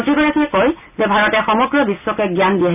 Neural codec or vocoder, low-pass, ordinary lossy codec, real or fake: none; 3.6 kHz; none; real